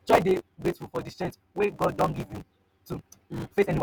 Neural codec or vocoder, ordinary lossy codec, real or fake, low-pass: vocoder, 48 kHz, 128 mel bands, Vocos; none; fake; none